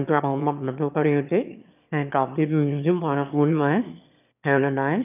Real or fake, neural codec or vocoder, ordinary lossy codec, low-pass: fake; autoencoder, 22.05 kHz, a latent of 192 numbers a frame, VITS, trained on one speaker; none; 3.6 kHz